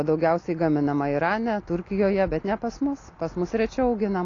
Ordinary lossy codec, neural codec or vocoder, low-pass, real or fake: AAC, 32 kbps; none; 7.2 kHz; real